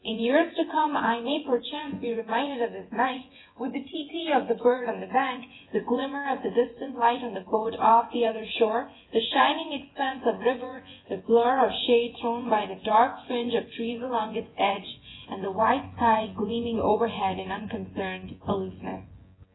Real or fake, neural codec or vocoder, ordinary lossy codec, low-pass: fake; vocoder, 24 kHz, 100 mel bands, Vocos; AAC, 16 kbps; 7.2 kHz